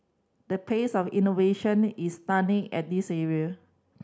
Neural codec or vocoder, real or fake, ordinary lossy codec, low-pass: none; real; none; none